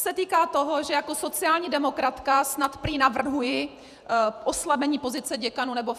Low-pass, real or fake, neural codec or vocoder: 14.4 kHz; fake; vocoder, 48 kHz, 128 mel bands, Vocos